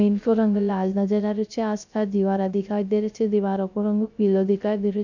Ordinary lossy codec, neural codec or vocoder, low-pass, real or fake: none; codec, 16 kHz, 0.3 kbps, FocalCodec; 7.2 kHz; fake